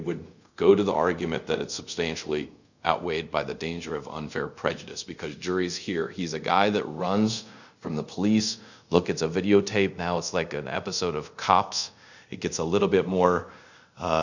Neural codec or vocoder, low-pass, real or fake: codec, 24 kHz, 0.5 kbps, DualCodec; 7.2 kHz; fake